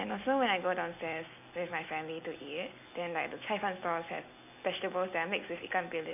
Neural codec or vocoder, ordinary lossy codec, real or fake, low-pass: none; none; real; 3.6 kHz